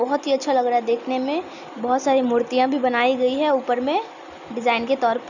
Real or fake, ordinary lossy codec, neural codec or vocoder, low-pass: real; none; none; 7.2 kHz